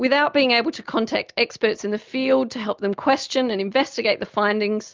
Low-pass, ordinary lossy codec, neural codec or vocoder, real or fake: 7.2 kHz; Opus, 24 kbps; none; real